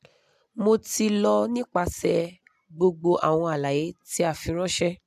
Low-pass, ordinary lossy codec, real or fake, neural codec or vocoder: 14.4 kHz; AAC, 96 kbps; real; none